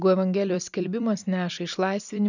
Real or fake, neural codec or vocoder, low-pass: fake; vocoder, 44.1 kHz, 128 mel bands every 256 samples, BigVGAN v2; 7.2 kHz